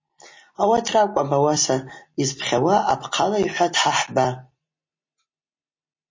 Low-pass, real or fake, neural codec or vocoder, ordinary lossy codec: 7.2 kHz; real; none; MP3, 32 kbps